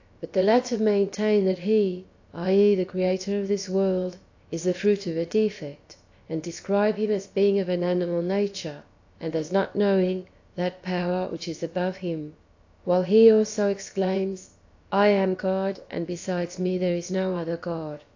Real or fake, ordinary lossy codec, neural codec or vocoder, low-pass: fake; AAC, 48 kbps; codec, 16 kHz, about 1 kbps, DyCAST, with the encoder's durations; 7.2 kHz